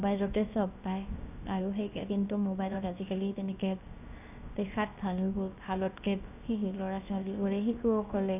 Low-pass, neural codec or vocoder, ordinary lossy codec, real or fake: 3.6 kHz; codec, 16 kHz, 0.7 kbps, FocalCodec; none; fake